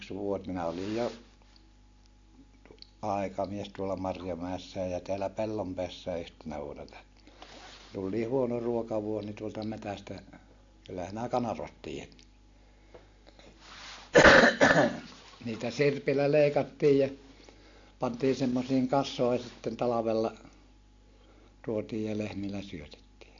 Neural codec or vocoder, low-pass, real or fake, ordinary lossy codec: none; 7.2 kHz; real; AAC, 48 kbps